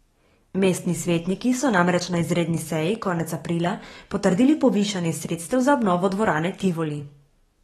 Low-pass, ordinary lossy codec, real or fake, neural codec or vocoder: 19.8 kHz; AAC, 32 kbps; fake; codec, 44.1 kHz, 7.8 kbps, DAC